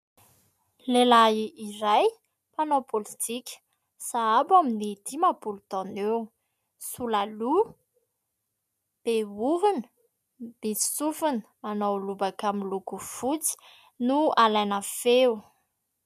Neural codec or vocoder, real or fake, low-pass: none; real; 14.4 kHz